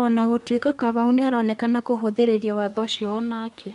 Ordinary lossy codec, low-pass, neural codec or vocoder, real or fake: none; 10.8 kHz; codec, 24 kHz, 1 kbps, SNAC; fake